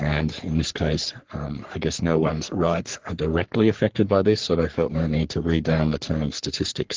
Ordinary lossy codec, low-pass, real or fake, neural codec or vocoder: Opus, 16 kbps; 7.2 kHz; fake; codec, 44.1 kHz, 3.4 kbps, Pupu-Codec